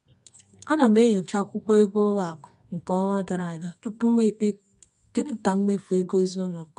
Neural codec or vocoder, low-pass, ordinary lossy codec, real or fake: codec, 24 kHz, 0.9 kbps, WavTokenizer, medium music audio release; 10.8 kHz; MP3, 64 kbps; fake